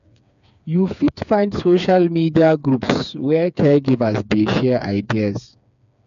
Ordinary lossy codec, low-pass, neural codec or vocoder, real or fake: none; 7.2 kHz; codec, 16 kHz, 4 kbps, FreqCodec, smaller model; fake